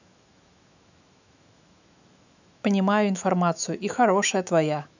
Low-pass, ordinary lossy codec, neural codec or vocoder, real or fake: 7.2 kHz; none; autoencoder, 48 kHz, 128 numbers a frame, DAC-VAE, trained on Japanese speech; fake